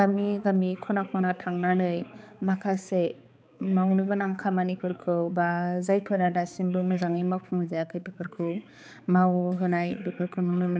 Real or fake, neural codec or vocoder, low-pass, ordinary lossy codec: fake; codec, 16 kHz, 4 kbps, X-Codec, HuBERT features, trained on balanced general audio; none; none